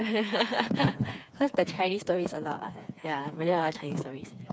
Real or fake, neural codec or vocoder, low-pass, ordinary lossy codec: fake; codec, 16 kHz, 4 kbps, FreqCodec, smaller model; none; none